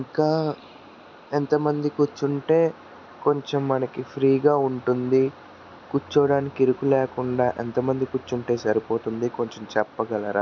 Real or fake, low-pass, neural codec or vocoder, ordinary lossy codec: real; 7.2 kHz; none; none